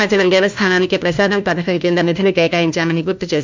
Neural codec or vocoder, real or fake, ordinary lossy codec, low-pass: codec, 16 kHz, 1 kbps, FunCodec, trained on LibriTTS, 50 frames a second; fake; MP3, 64 kbps; 7.2 kHz